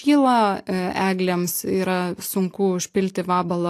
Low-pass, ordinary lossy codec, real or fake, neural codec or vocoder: 14.4 kHz; AAC, 64 kbps; real; none